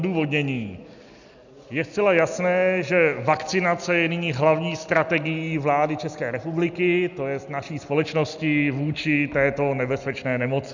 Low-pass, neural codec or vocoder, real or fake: 7.2 kHz; none; real